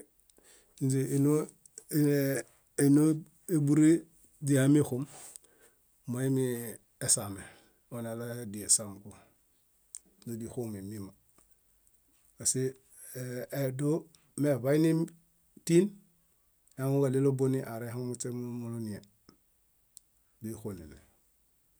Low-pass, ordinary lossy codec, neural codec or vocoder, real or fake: none; none; none; real